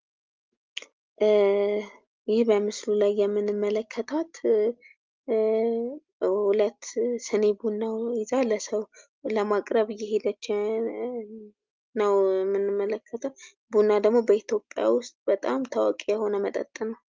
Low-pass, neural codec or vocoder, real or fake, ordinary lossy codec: 7.2 kHz; none; real; Opus, 32 kbps